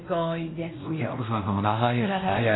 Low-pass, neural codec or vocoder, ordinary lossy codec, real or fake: 7.2 kHz; codec, 16 kHz, 1 kbps, X-Codec, WavLM features, trained on Multilingual LibriSpeech; AAC, 16 kbps; fake